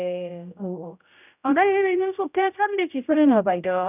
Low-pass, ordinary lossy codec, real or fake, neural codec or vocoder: 3.6 kHz; none; fake; codec, 16 kHz, 0.5 kbps, X-Codec, HuBERT features, trained on general audio